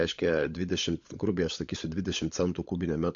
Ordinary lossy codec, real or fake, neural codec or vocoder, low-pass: MP3, 48 kbps; fake; codec, 16 kHz, 16 kbps, FunCodec, trained on LibriTTS, 50 frames a second; 7.2 kHz